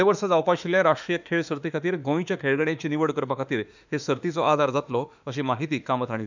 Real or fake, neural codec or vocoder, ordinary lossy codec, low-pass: fake; autoencoder, 48 kHz, 32 numbers a frame, DAC-VAE, trained on Japanese speech; none; 7.2 kHz